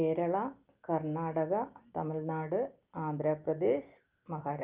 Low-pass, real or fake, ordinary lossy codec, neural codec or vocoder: 3.6 kHz; real; Opus, 32 kbps; none